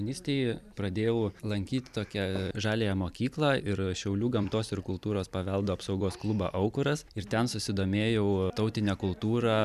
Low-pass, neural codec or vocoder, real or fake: 14.4 kHz; vocoder, 44.1 kHz, 128 mel bands every 256 samples, BigVGAN v2; fake